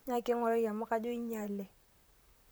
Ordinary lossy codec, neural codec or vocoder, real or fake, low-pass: none; vocoder, 44.1 kHz, 128 mel bands, Pupu-Vocoder; fake; none